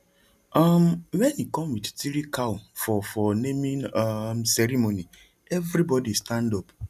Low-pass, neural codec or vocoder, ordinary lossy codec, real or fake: 14.4 kHz; none; none; real